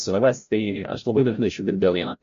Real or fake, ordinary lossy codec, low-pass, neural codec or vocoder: fake; MP3, 48 kbps; 7.2 kHz; codec, 16 kHz, 0.5 kbps, FreqCodec, larger model